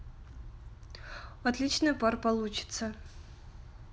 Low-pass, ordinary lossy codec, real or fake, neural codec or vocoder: none; none; real; none